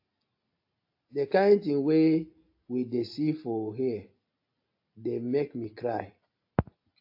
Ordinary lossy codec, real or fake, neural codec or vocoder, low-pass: AAC, 32 kbps; real; none; 5.4 kHz